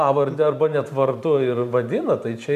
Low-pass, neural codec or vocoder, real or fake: 14.4 kHz; vocoder, 44.1 kHz, 128 mel bands every 512 samples, BigVGAN v2; fake